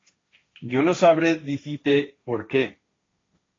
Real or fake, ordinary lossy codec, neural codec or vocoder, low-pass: fake; AAC, 32 kbps; codec, 16 kHz, 1.1 kbps, Voila-Tokenizer; 7.2 kHz